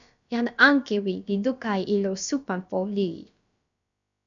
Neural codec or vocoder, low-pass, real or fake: codec, 16 kHz, about 1 kbps, DyCAST, with the encoder's durations; 7.2 kHz; fake